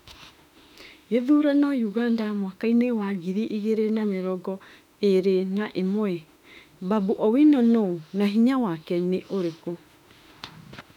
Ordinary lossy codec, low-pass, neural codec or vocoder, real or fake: none; 19.8 kHz; autoencoder, 48 kHz, 32 numbers a frame, DAC-VAE, trained on Japanese speech; fake